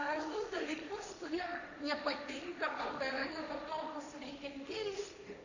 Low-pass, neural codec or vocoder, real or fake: 7.2 kHz; codec, 16 kHz, 1.1 kbps, Voila-Tokenizer; fake